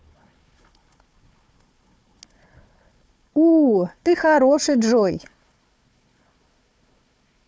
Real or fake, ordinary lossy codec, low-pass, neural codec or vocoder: fake; none; none; codec, 16 kHz, 4 kbps, FunCodec, trained on Chinese and English, 50 frames a second